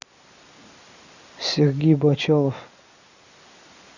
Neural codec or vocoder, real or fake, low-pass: none; real; 7.2 kHz